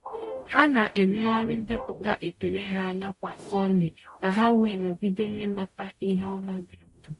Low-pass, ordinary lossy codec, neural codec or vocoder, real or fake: 14.4 kHz; MP3, 48 kbps; codec, 44.1 kHz, 0.9 kbps, DAC; fake